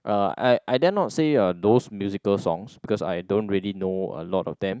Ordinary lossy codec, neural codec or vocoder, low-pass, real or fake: none; none; none; real